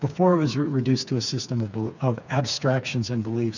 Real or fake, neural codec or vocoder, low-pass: fake; codec, 16 kHz, 4 kbps, FreqCodec, smaller model; 7.2 kHz